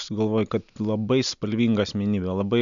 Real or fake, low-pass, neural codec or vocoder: real; 7.2 kHz; none